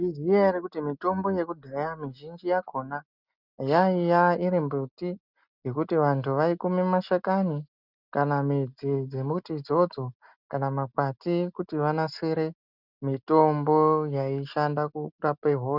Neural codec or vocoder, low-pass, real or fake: none; 5.4 kHz; real